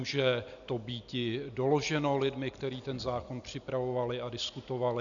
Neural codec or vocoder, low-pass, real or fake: none; 7.2 kHz; real